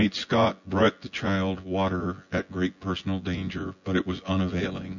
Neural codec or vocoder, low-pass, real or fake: vocoder, 24 kHz, 100 mel bands, Vocos; 7.2 kHz; fake